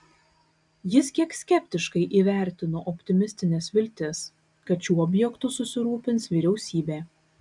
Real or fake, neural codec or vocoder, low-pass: real; none; 10.8 kHz